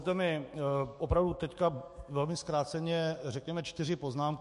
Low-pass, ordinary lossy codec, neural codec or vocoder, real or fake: 14.4 kHz; MP3, 48 kbps; autoencoder, 48 kHz, 128 numbers a frame, DAC-VAE, trained on Japanese speech; fake